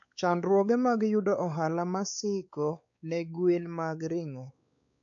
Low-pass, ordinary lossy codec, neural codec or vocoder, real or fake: 7.2 kHz; AAC, 64 kbps; codec, 16 kHz, 4 kbps, X-Codec, WavLM features, trained on Multilingual LibriSpeech; fake